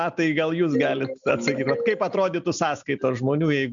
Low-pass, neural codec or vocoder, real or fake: 7.2 kHz; none; real